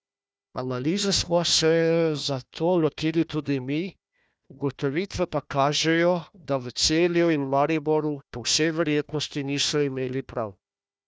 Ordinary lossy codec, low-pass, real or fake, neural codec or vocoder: none; none; fake; codec, 16 kHz, 1 kbps, FunCodec, trained on Chinese and English, 50 frames a second